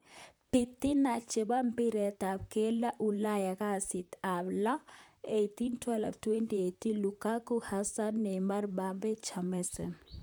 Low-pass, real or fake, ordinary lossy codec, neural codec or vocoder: none; fake; none; vocoder, 44.1 kHz, 128 mel bands, Pupu-Vocoder